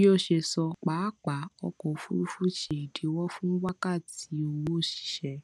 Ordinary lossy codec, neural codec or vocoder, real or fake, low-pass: none; none; real; none